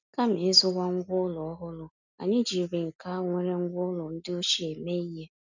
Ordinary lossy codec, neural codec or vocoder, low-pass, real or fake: none; none; 7.2 kHz; real